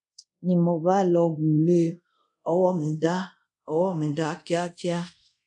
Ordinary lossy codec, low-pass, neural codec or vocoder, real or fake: none; 10.8 kHz; codec, 24 kHz, 0.5 kbps, DualCodec; fake